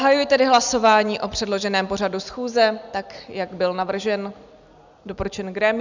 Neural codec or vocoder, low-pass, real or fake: none; 7.2 kHz; real